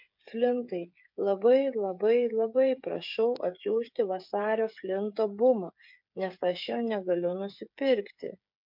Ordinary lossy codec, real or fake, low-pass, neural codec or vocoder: MP3, 48 kbps; fake; 5.4 kHz; codec, 16 kHz, 8 kbps, FreqCodec, smaller model